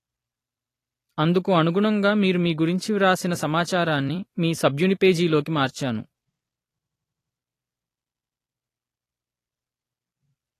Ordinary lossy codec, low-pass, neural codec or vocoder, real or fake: AAC, 48 kbps; 14.4 kHz; vocoder, 44.1 kHz, 128 mel bands every 256 samples, BigVGAN v2; fake